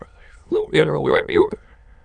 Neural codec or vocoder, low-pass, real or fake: autoencoder, 22.05 kHz, a latent of 192 numbers a frame, VITS, trained on many speakers; 9.9 kHz; fake